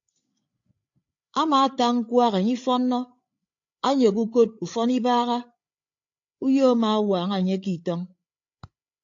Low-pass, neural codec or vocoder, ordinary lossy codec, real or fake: 7.2 kHz; codec, 16 kHz, 8 kbps, FreqCodec, larger model; AAC, 48 kbps; fake